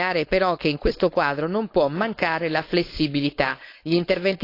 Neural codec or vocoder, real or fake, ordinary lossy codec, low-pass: codec, 16 kHz, 4.8 kbps, FACodec; fake; AAC, 32 kbps; 5.4 kHz